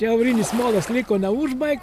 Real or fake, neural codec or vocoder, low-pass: real; none; 14.4 kHz